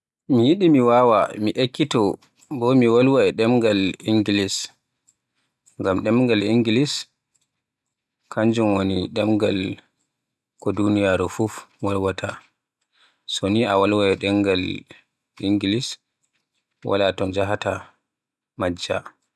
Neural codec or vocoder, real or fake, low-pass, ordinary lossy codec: none; real; none; none